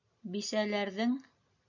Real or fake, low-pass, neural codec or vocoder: real; 7.2 kHz; none